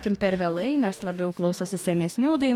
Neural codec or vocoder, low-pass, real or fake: codec, 44.1 kHz, 2.6 kbps, DAC; 19.8 kHz; fake